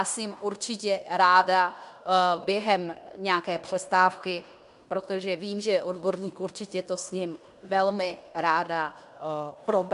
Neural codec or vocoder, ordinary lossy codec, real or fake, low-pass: codec, 16 kHz in and 24 kHz out, 0.9 kbps, LongCat-Audio-Codec, fine tuned four codebook decoder; MP3, 96 kbps; fake; 10.8 kHz